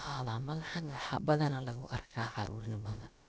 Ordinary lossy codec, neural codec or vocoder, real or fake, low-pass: none; codec, 16 kHz, about 1 kbps, DyCAST, with the encoder's durations; fake; none